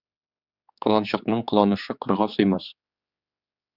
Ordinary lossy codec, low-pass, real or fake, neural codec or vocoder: Opus, 64 kbps; 5.4 kHz; fake; codec, 16 kHz, 4 kbps, X-Codec, HuBERT features, trained on general audio